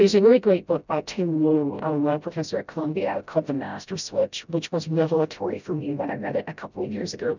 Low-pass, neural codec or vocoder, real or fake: 7.2 kHz; codec, 16 kHz, 0.5 kbps, FreqCodec, smaller model; fake